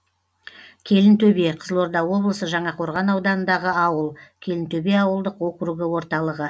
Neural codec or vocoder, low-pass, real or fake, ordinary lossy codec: none; none; real; none